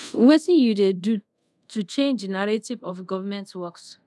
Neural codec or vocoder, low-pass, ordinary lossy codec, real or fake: codec, 24 kHz, 0.5 kbps, DualCodec; none; none; fake